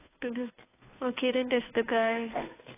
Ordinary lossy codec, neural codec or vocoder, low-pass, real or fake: AAC, 16 kbps; codec, 16 kHz, 2 kbps, FunCodec, trained on Chinese and English, 25 frames a second; 3.6 kHz; fake